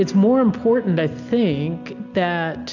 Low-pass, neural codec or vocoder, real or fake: 7.2 kHz; none; real